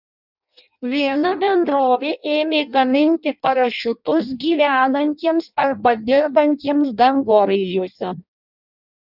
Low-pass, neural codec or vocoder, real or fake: 5.4 kHz; codec, 16 kHz in and 24 kHz out, 0.6 kbps, FireRedTTS-2 codec; fake